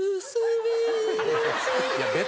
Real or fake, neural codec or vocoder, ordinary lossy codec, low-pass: real; none; none; none